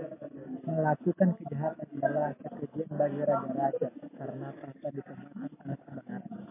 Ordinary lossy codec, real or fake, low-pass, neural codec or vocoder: MP3, 24 kbps; real; 3.6 kHz; none